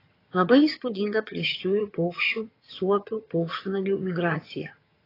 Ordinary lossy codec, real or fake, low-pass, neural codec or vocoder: AAC, 24 kbps; fake; 5.4 kHz; vocoder, 22.05 kHz, 80 mel bands, HiFi-GAN